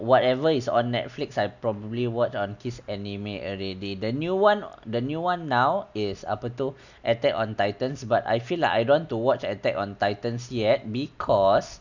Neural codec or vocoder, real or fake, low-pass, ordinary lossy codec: none; real; 7.2 kHz; none